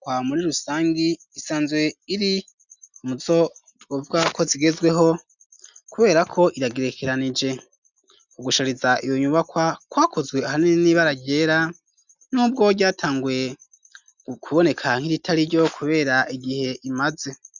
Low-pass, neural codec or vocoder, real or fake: 7.2 kHz; none; real